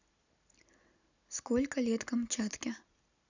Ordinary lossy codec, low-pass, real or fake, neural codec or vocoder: none; 7.2 kHz; real; none